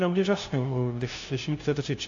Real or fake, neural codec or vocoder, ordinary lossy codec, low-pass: fake; codec, 16 kHz, 0.5 kbps, FunCodec, trained on LibriTTS, 25 frames a second; AAC, 48 kbps; 7.2 kHz